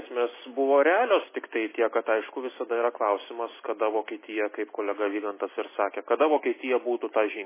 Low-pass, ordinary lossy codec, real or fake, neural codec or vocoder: 3.6 kHz; MP3, 16 kbps; real; none